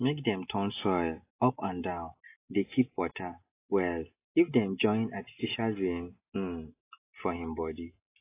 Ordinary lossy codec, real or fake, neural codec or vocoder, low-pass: AAC, 24 kbps; real; none; 3.6 kHz